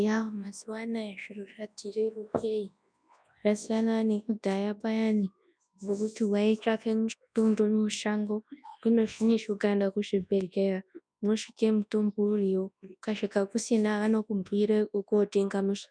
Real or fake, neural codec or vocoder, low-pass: fake; codec, 24 kHz, 0.9 kbps, WavTokenizer, large speech release; 9.9 kHz